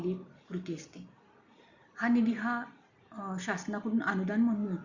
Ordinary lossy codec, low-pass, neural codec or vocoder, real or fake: Opus, 64 kbps; 7.2 kHz; none; real